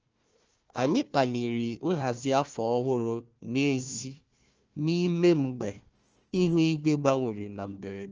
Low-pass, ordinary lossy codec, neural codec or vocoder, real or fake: 7.2 kHz; Opus, 24 kbps; codec, 16 kHz, 1 kbps, FunCodec, trained on Chinese and English, 50 frames a second; fake